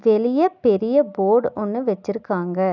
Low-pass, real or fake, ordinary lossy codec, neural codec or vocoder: 7.2 kHz; real; none; none